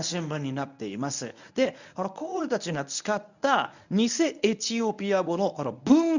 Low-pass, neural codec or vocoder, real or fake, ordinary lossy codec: 7.2 kHz; codec, 24 kHz, 0.9 kbps, WavTokenizer, medium speech release version 1; fake; none